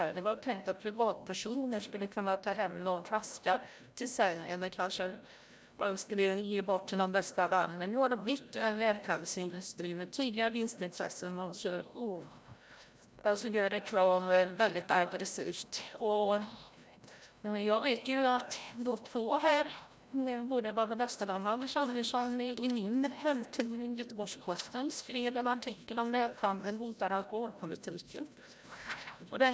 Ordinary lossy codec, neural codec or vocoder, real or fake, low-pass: none; codec, 16 kHz, 0.5 kbps, FreqCodec, larger model; fake; none